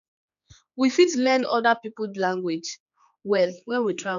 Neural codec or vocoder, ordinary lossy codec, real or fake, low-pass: codec, 16 kHz, 4 kbps, X-Codec, HuBERT features, trained on general audio; none; fake; 7.2 kHz